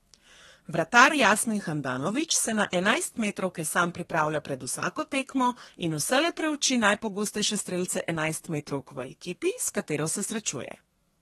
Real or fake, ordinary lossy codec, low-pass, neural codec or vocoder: fake; AAC, 32 kbps; 14.4 kHz; codec, 32 kHz, 1.9 kbps, SNAC